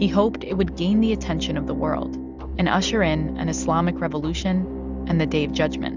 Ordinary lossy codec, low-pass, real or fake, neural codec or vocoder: Opus, 64 kbps; 7.2 kHz; real; none